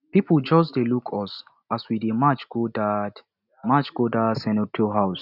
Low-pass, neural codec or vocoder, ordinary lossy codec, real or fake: 5.4 kHz; none; none; real